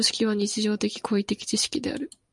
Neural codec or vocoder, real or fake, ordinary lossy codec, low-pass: none; real; MP3, 96 kbps; 10.8 kHz